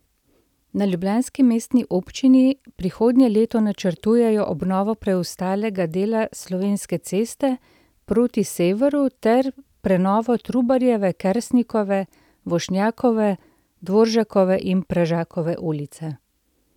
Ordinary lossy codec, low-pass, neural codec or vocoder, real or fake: none; 19.8 kHz; none; real